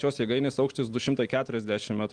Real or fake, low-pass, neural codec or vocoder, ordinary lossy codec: real; 9.9 kHz; none; Opus, 24 kbps